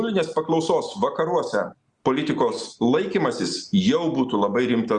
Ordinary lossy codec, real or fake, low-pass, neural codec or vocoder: Opus, 64 kbps; real; 10.8 kHz; none